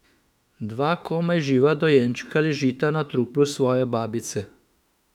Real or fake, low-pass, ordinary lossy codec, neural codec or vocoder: fake; 19.8 kHz; none; autoencoder, 48 kHz, 32 numbers a frame, DAC-VAE, trained on Japanese speech